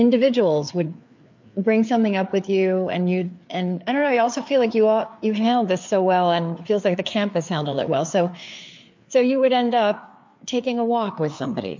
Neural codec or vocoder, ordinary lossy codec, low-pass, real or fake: codec, 16 kHz, 4 kbps, FreqCodec, larger model; MP3, 48 kbps; 7.2 kHz; fake